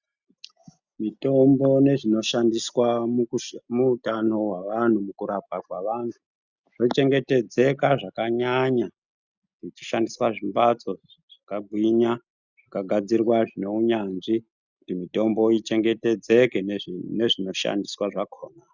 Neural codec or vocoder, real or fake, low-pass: none; real; 7.2 kHz